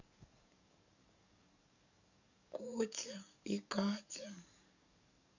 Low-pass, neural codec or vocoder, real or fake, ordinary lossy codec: 7.2 kHz; codec, 44.1 kHz, 7.8 kbps, DAC; fake; none